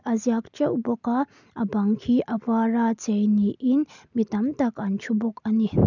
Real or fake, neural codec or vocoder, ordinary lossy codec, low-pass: real; none; none; 7.2 kHz